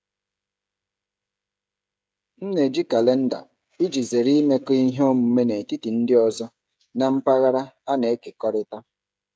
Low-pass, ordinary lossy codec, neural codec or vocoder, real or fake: none; none; codec, 16 kHz, 16 kbps, FreqCodec, smaller model; fake